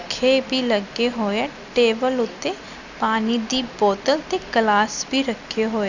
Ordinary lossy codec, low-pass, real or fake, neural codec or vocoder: none; 7.2 kHz; real; none